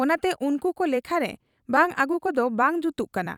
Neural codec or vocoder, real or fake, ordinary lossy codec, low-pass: vocoder, 44.1 kHz, 128 mel bands every 256 samples, BigVGAN v2; fake; none; 19.8 kHz